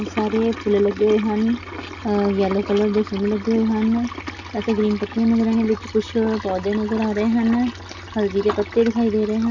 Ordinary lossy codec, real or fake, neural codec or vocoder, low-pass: none; real; none; 7.2 kHz